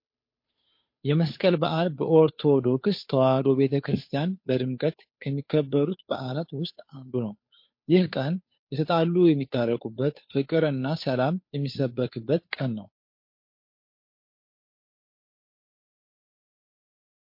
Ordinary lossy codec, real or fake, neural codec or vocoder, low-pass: MP3, 32 kbps; fake; codec, 16 kHz, 2 kbps, FunCodec, trained on Chinese and English, 25 frames a second; 5.4 kHz